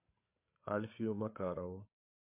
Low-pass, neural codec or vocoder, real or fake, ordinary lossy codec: 3.6 kHz; codec, 16 kHz, 8 kbps, FreqCodec, larger model; fake; MP3, 32 kbps